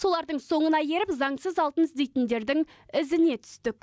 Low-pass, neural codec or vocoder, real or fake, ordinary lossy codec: none; none; real; none